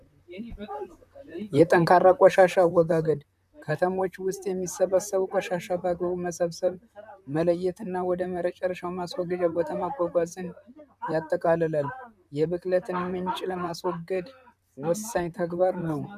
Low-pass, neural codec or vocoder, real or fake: 14.4 kHz; vocoder, 44.1 kHz, 128 mel bands, Pupu-Vocoder; fake